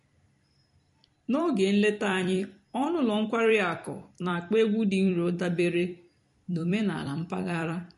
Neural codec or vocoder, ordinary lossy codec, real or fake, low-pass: vocoder, 44.1 kHz, 128 mel bands every 256 samples, BigVGAN v2; MP3, 48 kbps; fake; 14.4 kHz